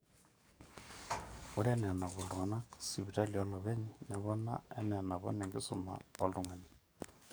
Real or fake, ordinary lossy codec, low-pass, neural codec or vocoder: fake; none; none; codec, 44.1 kHz, 7.8 kbps, DAC